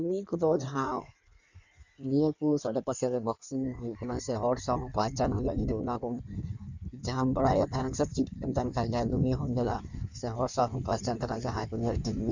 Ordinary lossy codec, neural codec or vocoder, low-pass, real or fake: none; codec, 16 kHz in and 24 kHz out, 1.1 kbps, FireRedTTS-2 codec; 7.2 kHz; fake